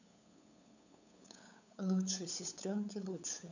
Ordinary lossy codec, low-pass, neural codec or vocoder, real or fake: none; 7.2 kHz; codec, 16 kHz, 8 kbps, FunCodec, trained on LibriTTS, 25 frames a second; fake